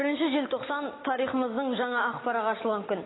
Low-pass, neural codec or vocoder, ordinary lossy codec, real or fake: 7.2 kHz; none; AAC, 16 kbps; real